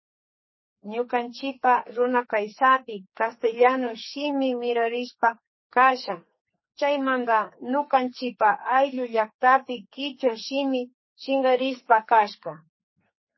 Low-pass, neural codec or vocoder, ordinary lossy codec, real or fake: 7.2 kHz; codec, 44.1 kHz, 2.6 kbps, SNAC; MP3, 24 kbps; fake